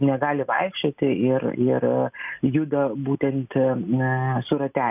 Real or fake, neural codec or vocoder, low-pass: real; none; 3.6 kHz